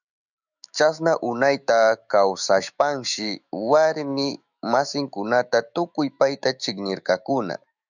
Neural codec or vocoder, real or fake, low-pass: autoencoder, 48 kHz, 128 numbers a frame, DAC-VAE, trained on Japanese speech; fake; 7.2 kHz